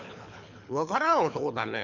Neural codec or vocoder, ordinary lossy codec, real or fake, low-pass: codec, 24 kHz, 6 kbps, HILCodec; none; fake; 7.2 kHz